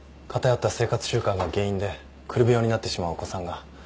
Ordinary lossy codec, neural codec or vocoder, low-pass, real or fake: none; none; none; real